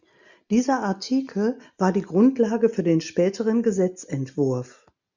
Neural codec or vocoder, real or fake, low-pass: none; real; 7.2 kHz